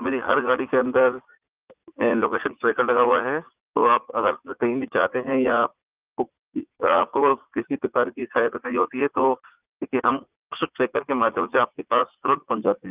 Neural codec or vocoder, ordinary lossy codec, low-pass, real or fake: vocoder, 44.1 kHz, 80 mel bands, Vocos; Opus, 24 kbps; 3.6 kHz; fake